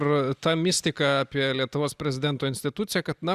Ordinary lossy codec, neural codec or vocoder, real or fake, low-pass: Opus, 64 kbps; none; real; 14.4 kHz